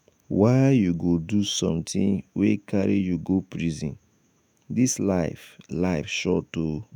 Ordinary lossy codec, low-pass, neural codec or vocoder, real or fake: none; none; autoencoder, 48 kHz, 128 numbers a frame, DAC-VAE, trained on Japanese speech; fake